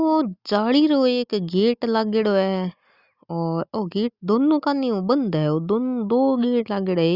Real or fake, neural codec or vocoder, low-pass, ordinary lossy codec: real; none; 5.4 kHz; Opus, 64 kbps